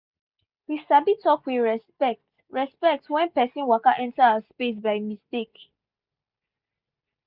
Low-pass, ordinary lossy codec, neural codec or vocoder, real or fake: 5.4 kHz; Opus, 64 kbps; none; real